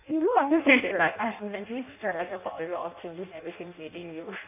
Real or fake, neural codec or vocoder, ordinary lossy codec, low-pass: fake; codec, 16 kHz in and 24 kHz out, 0.6 kbps, FireRedTTS-2 codec; none; 3.6 kHz